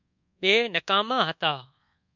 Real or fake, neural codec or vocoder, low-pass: fake; codec, 24 kHz, 1.2 kbps, DualCodec; 7.2 kHz